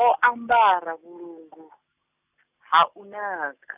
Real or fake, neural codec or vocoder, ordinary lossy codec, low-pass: real; none; none; 3.6 kHz